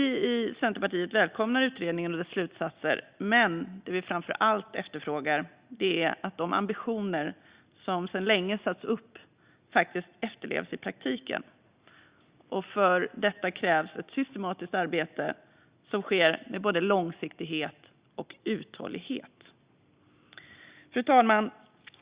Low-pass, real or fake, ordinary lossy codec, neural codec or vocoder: 3.6 kHz; real; Opus, 64 kbps; none